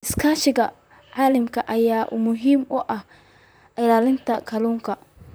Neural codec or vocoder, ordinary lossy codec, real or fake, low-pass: vocoder, 44.1 kHz, 128 mel bands, Pupu-Vocoder; none; fake; none